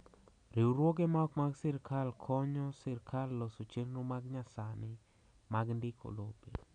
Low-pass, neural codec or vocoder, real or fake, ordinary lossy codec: 9.9 kHz; none; real; none